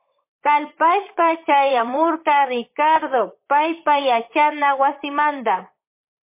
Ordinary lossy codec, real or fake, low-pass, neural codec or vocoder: MP3, 24 kbps; fake; 3.6 kHz; vocoder, 44.1 kHz, 128 mel bands, Pupu-Vocoder